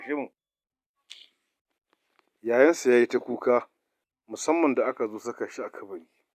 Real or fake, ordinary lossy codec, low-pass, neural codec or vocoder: real; none; 14.4 kHz; none